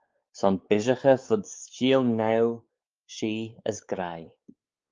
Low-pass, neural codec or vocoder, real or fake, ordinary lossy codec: 7.2 kHz; codec, 16 kHz, 4 kbps, X-Codec, WavLM features, trained on Multilingual LibriSpeech; fake; Opus, 24 kbps